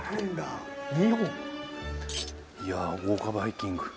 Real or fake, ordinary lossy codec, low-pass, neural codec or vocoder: real; none; none; none